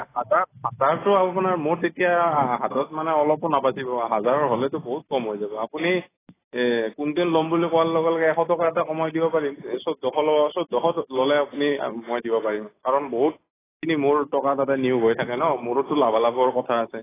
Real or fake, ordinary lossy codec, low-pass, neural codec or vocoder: real; AAC, 16 kbps; 3.6 kHz; none